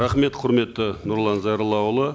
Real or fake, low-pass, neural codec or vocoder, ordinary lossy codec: real; none; none; none